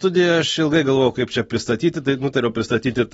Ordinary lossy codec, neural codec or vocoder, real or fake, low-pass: AAC, 24 kbps; none; real; 14.4 kHz